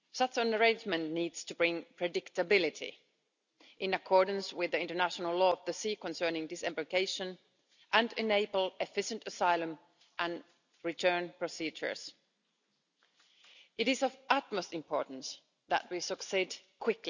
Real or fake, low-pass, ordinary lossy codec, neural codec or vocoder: real; 7.2 kHz; none; none